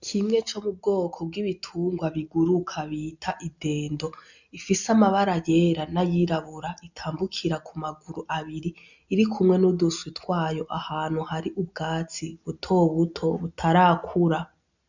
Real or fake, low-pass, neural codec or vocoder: real; 7.2 kHz; none